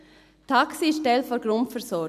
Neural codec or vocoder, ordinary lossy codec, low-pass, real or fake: none; none; 14.4 kHz; real